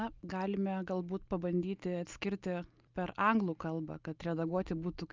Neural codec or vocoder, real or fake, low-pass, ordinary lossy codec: none; real; 7.2 kHz; Opus, 24 kbps